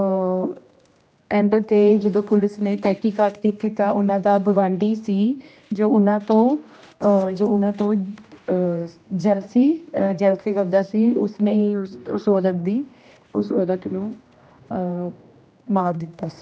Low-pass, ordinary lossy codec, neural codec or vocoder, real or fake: none; none; codec, 16 kHz, 1 kbps, X-Codec, HuBERT features, trained on general audio; fake